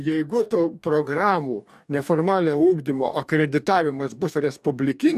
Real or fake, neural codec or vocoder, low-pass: fake; codec, 44.1 kHz, 2.6 kbps, DAC; 14.4 kHz